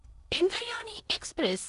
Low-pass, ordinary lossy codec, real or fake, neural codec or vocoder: 10.8 kHz; none; fake; codec, 16 kHz in and 24 kHz out, 0.8 kbps, FocalCodec, streaming, 65536 codes